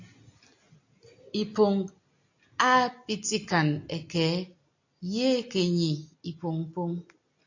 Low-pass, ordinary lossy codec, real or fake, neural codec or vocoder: 7.2 kHz; AAC, 48 kbps; real; none